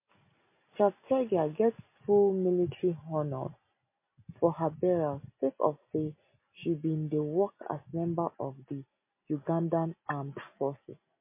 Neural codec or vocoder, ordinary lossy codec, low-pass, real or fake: none; MP3, 24 kbps; 3.6 kHz; real